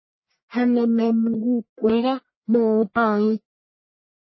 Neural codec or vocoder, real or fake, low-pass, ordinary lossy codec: codec, 44.1 kHz, 1.7 kbps, Pupu-Codec; fake; 7.2 kHz; MP3, 24 kbps